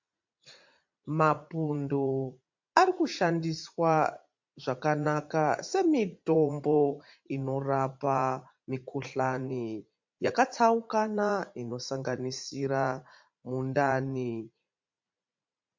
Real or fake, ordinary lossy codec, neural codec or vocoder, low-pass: fake; MP3, 48 kbps; vocoder, 44.1 kHz, 80 mel bands, Vocos; 7.2 kHz